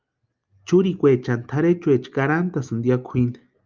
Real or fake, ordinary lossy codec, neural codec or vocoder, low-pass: real; Opus, 24 kbps; none; 7.2 kHz